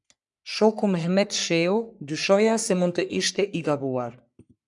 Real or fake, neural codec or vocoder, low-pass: fake; codec, 44.1 kHz, 3.4 kbps, Pupu-Codec; 10.8 kHz